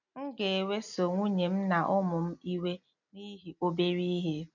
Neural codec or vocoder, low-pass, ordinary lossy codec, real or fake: none; 7.2 kHz; none; real